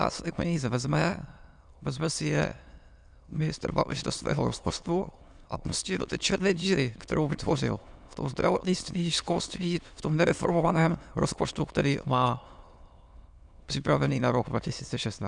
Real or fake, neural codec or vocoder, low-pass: fake; autoencoder, 22.05 kHz, a latent of 192 numbers a frame, VITS, trained on many speakers; 9.9 kHz